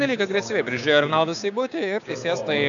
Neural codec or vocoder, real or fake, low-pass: codec, 16 kHz, 6 kbps, DAC; fake; 7.2 kHz